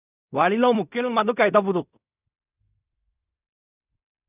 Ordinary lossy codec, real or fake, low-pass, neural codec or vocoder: none; fake; 3.6 kHz; codec, 16 kHz in and 24 kHz out, 0.4 kbps, LongCat-Audio-Codec, fine tuned four codebook decoder